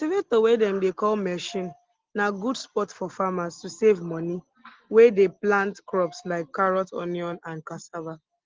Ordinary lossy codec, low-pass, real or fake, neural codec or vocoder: Opus, 16 kbps; 7.2 kHz; real; none